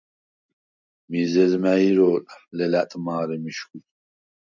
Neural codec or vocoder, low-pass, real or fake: none; 7.2 kHz; real